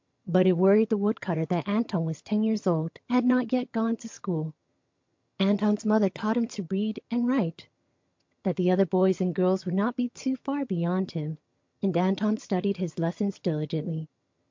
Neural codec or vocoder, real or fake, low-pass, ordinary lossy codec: vocoder, 22.05 kHz, 80 mel bands, HiFi-GAN; fake; 7.2 kHz; MP3, 48 kbps